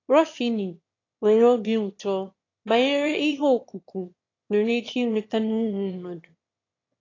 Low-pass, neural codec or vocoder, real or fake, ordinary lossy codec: 7.2 kHz; autoencoder, 22.05 kHz, a latent of 192 numbers a frame, VITS, trained on one speaker; fake; AAC, 32 kbps